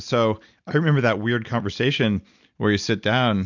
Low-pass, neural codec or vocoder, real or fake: 7.2 kHz; none; real